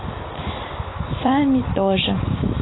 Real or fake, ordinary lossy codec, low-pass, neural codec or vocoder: real; AAC, 16 kbps; 7.2 kHz; none